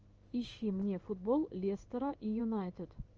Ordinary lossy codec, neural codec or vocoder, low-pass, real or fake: Opus, 24 kbps; codec, 16 kHz in and 24 kHz out, 1 kbps, XY-Tokenizer; 7.2 kHz; fake